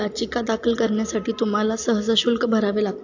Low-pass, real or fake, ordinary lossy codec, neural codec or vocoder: 7.2 kHz; real; AAC, 48 kbps; none